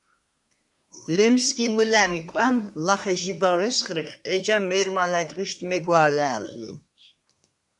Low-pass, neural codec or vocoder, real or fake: 10.8 kHz; codec, 24 kHz, 1 kbps, SNAC; fake